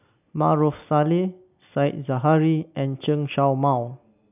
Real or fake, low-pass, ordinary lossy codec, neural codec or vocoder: real; 3.6 kHz; none; none